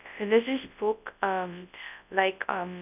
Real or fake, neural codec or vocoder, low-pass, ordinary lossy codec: fake; codec, 24 kHz, 0.9 kbps, WavTokenizer, large speech release; 3.6 kHz; none